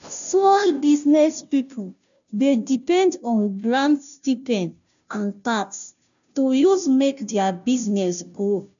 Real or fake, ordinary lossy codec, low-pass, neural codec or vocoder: fake; none; 7.2 kHz; codec, 16 kHz, 0.5 kbps, FunCodec, trained on Chinese and English, 25 frames a second